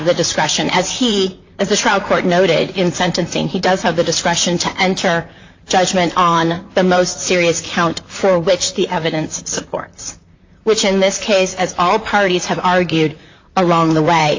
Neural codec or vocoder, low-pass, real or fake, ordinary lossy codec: vocoder, 44.1 kHz, 128 mel bands, Pupu-Vocoder; 7.2 kHz; fake; AAC, 48 kbps